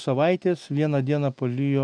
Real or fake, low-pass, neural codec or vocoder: real; 9.9 kHz; none